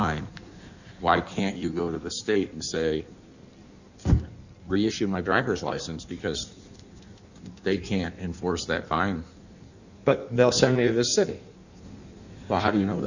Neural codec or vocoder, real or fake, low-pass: codec, 16 kHz in and 24 kHz out, 1.1 kbps, FireRedTTS-2 codec; fake; 7.2 kHz